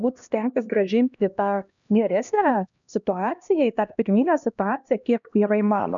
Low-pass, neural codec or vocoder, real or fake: 7.2 kHz; codec, 16 kHz, 1 kbps, X-Codec, HuBERT features, trained on LibriSpeech; fake